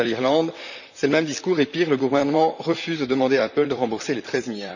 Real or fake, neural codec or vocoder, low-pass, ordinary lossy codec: fake; vocoder, 44.1 kHz, 128 mel bands, Pupu-Vocoder; 7.2 kHz; none